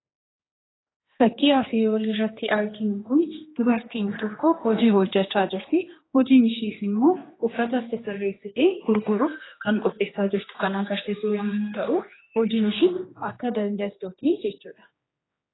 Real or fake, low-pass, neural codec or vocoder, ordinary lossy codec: fake; 7.2 kHz; codec, 16 kHz, 2 kbps, X-Codec, HuBERT features, trained on general audio; AAC, 16 kbps